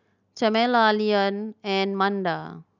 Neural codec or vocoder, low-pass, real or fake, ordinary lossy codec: none; 7.2 kHz; real; none